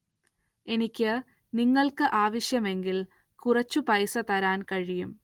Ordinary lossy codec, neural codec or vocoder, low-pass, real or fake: Opus, 24 kbps; none; 19.8 kHz; real